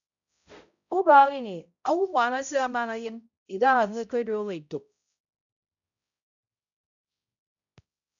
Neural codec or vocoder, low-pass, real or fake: codec, 16 kHz, 0.5 kbps, X-Codec, HuBERT features, trained on balanced general audio; 7.2 kHz; fake